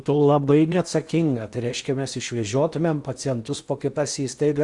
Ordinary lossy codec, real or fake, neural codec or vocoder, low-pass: Opus, 64 kbps; fake; codec, 16 kHz in and 24 kHz out, 0.8 kbps, FocalCodec, streaming, 65536 codes; 10.8 kHz